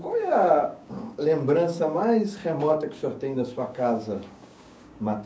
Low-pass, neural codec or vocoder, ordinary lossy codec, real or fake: none; codec, 16 kHz, 6 kbps, DAC; none; fake